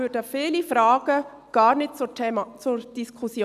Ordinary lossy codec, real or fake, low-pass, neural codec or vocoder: none; real; 14.4 kHz; none